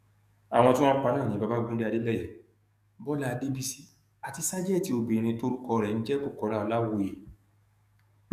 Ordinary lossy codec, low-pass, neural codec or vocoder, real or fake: none; 14.4 kHz; codec, 44.1 kHz, 7.8 kbps, DAC; fake